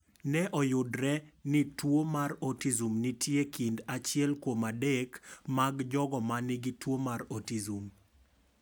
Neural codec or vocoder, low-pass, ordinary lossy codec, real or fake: none; none; none; real